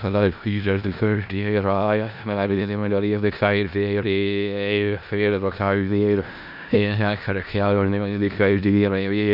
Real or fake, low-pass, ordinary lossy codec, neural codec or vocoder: fake; 5.4 kHz; none; codec, 16 kHz in and 24 kHz out, 0.4 kbps, LongCat-Audio-Codec, four codebook decoder